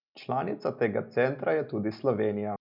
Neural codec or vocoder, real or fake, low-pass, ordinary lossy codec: none; real; 5.4 kHz; none